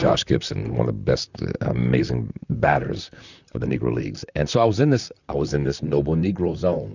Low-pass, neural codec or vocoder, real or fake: 7.2 kHz; vocoder, 44.1 kHz, 128 mel bands, Pupu-Vocoder; fake